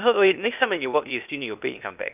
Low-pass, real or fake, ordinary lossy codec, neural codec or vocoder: 3.6 kHz; fake; none; codec, 16 kHz, 0.8 kbps, ZipCodec